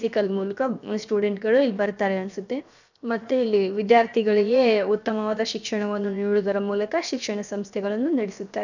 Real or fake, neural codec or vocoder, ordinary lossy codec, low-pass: fake; codec, 16 kHz, 0.7 kbps, FocalCodec; none; 7.2 kHz